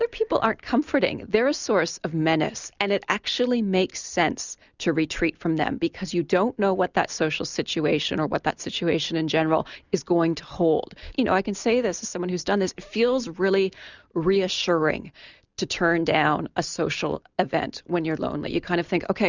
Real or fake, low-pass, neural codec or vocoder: real; 7.2 kHz; none